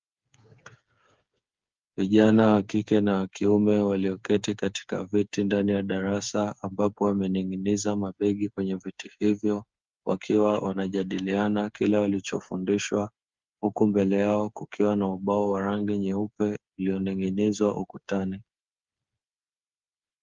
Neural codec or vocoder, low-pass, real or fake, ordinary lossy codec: codec, 16 kHz, 8 kbps, FreqCodec, smaller model; 7.2 kHz; fake; Opus, 32 kbps